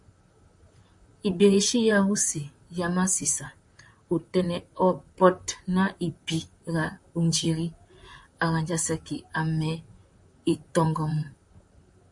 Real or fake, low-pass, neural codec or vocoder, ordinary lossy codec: fake; 10.8 kHz; vocoder, 44.1 kHz, 128 mel bands, Pupu-Vocoder; MP3, 96 kbps